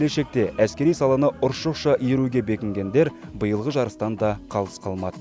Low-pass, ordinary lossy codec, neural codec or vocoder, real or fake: none; none; none; real